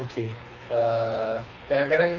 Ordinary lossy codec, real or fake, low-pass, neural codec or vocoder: none; fake; 7.2 kHz; codec, 24 kHz, 3 kbps, HILCodec